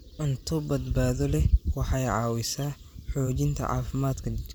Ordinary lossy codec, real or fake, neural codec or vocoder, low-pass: none; fake; vocoder, 44.1 kHz, 128 mel bands every 512 samples, BigVGAN v2; none